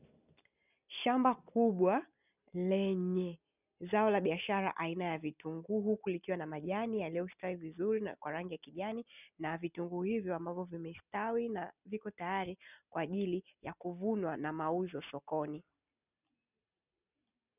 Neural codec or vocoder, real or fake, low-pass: none; real; 3.6 kHz